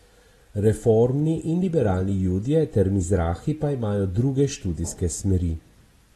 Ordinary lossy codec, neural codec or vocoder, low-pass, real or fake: AAC, 32 kbps; none; 19.8 kHz; real